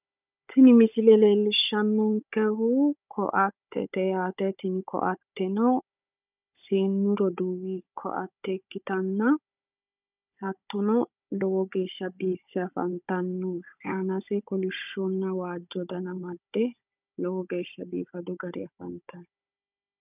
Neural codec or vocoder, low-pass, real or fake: codec, 16 kHz, 16 kbps, FunCodec, trained on Chinese and English, 50 frames a second; 3.6 kHz; fake